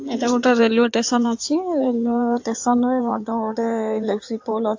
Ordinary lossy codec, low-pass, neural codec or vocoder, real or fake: AAC, 48 kbps; 7.2 kHz; codec, 16 kHz in and 24 kHz out, 2.2 kbps, FireRedTTS-2 codec; fake